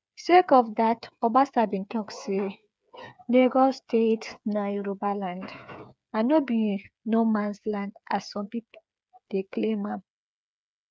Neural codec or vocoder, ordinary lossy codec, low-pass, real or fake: codec, 16 kHz, 8 kbps, FreqCodec, smaller model; none; none; fake